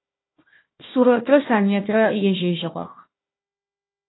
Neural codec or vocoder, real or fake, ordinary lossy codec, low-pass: codec, 16 kHz, 1 kbps, FunCodec, trained on Chinese and English, 50 frames a second; fake; AAC, 16 kbps; 7.2 kHz